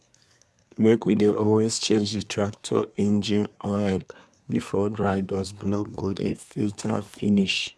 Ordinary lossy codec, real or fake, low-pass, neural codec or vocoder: none; fake; none; codec, 24 kHz, 1 kbps, SNAC